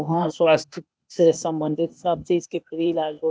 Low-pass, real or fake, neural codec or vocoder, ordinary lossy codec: none; fake; codec, 16 kHz, 0.8 kbps, ZipCodec; none